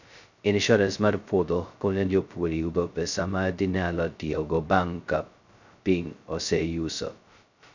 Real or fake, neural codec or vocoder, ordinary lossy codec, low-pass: fake; codec, 16 kHz, 0.2 kbps, FocalCodec; none; 7.2 kHz